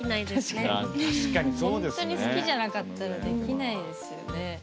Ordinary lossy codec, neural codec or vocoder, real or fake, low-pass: none; none; real; none